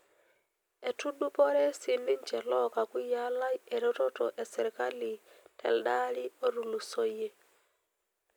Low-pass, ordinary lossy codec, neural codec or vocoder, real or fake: none; none; none; real